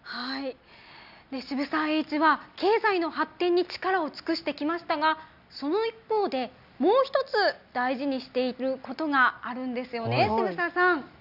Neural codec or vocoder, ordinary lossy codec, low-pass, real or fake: none; none; 5.4 kHz; real